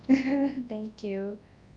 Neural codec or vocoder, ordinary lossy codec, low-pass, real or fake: codec, 24 kHz, 0.9 kbps, WavTokenizer, large speech release; Opus, 64 kbps; 9.9 kHz; fake